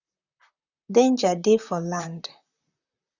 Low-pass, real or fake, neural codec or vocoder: 7.2 kHz; fake; vocoder, 44.1 kHz, 128 mel bands, Pupu-Vocoder